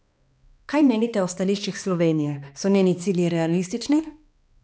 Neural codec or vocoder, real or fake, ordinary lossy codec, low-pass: codec, 16 kHz, 2 kbps, X-Codec, HuBERT features, trained on balanced general audio; fake; none; none